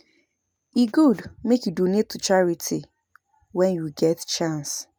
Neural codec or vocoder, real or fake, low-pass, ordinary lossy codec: none; real; none; none